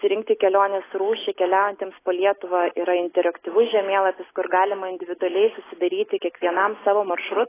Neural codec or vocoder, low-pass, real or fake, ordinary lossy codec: none; 3.6 kHz; real; AAC, 16 kbps